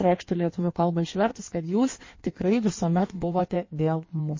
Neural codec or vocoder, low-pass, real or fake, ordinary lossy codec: codec, 16 kHz in and 24 kHz out, 1.1 kbps, FireRedTTS-2 codec; 7.2 kHz; fake; MP3, 32 kbps